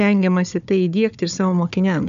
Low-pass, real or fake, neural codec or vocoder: 7.2 kHz; fake; codec, 16 kHz, 4 kbps, FunCodec, trained on Chinese and English, 50 frames a second